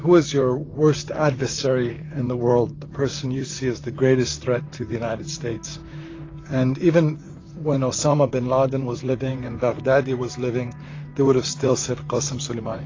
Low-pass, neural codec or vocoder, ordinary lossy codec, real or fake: 7.2 kHz; vocoder, 44.1 kHz, 128 mel bands, Pupu-Vocoder; AAC, 32 kbps; fake